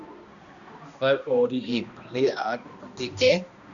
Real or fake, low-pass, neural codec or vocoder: fake; 7.2 kHz; codec, 16 kHz, 1 kbps, X-Codec, HuBERT features, trained on balanced general audio